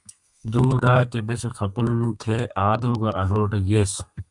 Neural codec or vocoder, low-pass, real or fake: codec, 32 kHz, 1.9 kbps, SNAC; 10.8 kHz; fake